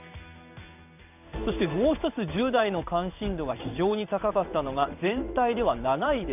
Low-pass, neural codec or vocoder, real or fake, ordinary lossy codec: 3.6 kHz; codec, 16 kHz in and 24 kHz out, 1 kbps, XY-Tokenizer; fake; none